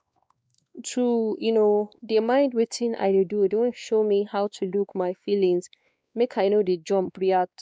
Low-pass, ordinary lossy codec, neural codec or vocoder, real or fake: none; none; codec, 16 kHz, 2 kbps, X-Codec, WavLM features, trained on Multilingual LibriSpeech; fake